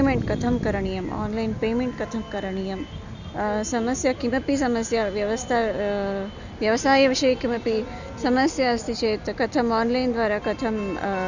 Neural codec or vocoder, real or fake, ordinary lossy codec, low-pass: none; real; none; 7.2 kHz